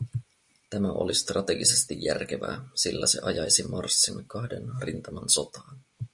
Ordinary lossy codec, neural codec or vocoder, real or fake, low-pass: MP3, 48 kbps; none; real; 10.8 kHz